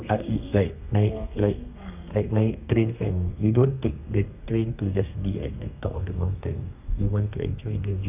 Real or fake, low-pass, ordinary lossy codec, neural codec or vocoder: fake; 3.6 kHz; none; codec, 32 kHz, 1.9 kbps, SNAC